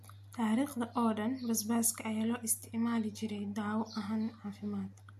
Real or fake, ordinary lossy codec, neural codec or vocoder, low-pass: real; MP3, 64 kbps; none; 14.4 kHz